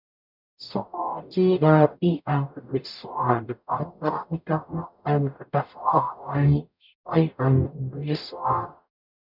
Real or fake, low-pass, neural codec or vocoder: fake; 5.4 kHz; codec, 44.1 kHz, 0.9 kbps, DAC